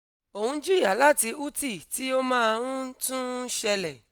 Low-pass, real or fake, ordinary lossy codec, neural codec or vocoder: none; real; none; none